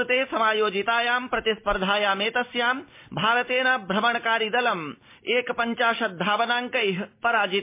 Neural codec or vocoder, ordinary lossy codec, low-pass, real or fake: none; MP3, 24 kbps; 3.6 kHz; real